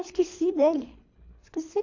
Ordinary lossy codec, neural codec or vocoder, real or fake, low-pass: AAC, 48 kbps; codec, 24 kHz, 3 kbps, HILCodec; fake; 7.2 kHz